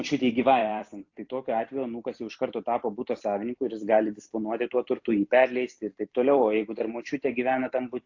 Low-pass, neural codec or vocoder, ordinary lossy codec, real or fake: 7.2 kHz; vocoder, 44.1 kHz, 128 mel bands every 256 samples, BigVGAN v2; AAC, 48 kbps; fake